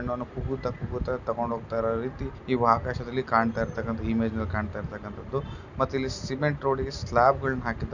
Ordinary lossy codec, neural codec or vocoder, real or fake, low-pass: none; none; real; 7.2 kHz